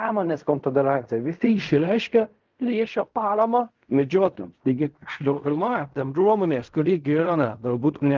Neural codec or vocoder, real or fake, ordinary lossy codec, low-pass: codec, 16 kHz in and 24 kHz out, 0.4 kbps, LongCat-Audio-Codec, fine tuned four codebook decoder; fake; Opus, 24 kbps; 7.2 kHz